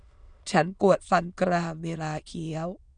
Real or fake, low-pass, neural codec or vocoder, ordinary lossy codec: fake; 9.9 kHz; autoencoder, 22.05 kHz, a latent of 192 numbers a frame, VITS, trained on many speakers; none